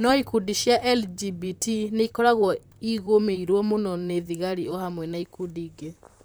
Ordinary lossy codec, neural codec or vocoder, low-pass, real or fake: none; vocoder, 44.1 kHz, 128 mel bands, Pupu-Vocoder; none; fake